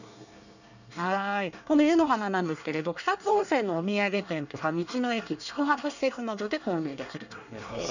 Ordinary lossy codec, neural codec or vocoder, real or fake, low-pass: none; codec, 24 kHz, 1 kbps, SNAC; fake; 7.2 kHz